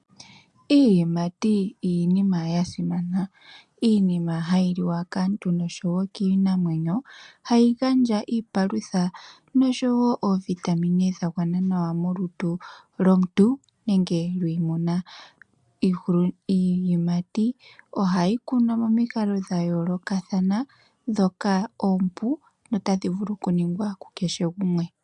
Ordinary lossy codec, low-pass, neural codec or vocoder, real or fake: MP3, 96 kbps; 10.8 kHz; none; real